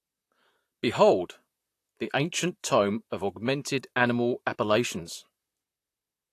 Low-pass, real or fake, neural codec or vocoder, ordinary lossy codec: 14.4 kHz; fake; vocoder, 44.1 kHz, 128 mel bands, Pupu-Vocoder; AAC, 64 kbps